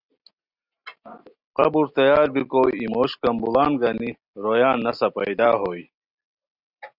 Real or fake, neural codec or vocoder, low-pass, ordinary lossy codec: real; none; 5.4 kHz; Opus, 64 kbps